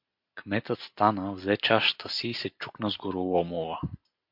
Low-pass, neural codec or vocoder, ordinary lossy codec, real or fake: 5.4 kHz; none; MP3, 48 kbps; real